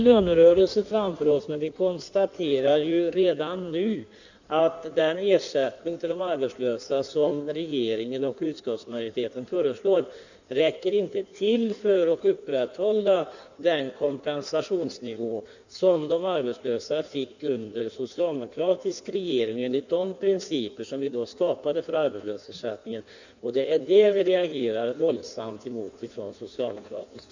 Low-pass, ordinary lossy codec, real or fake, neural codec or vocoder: 7.2 kHz; none; fake; codec, 16 kHz in and 24 kHz out, 1.1 kbps, FireRedTTS-2 codec